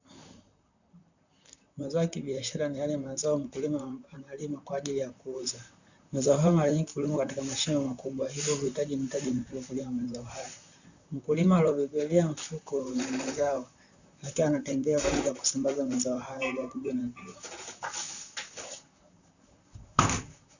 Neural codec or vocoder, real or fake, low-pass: vocoder, 44.1 kHz, 128 mel bands, Pupu-Vocoder; fake; 7.2 kHz